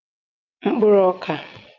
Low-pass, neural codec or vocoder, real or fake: 7.2 kHz; vocoder, 22.05 kHz, 80 mel bands, WaveNeXt; fake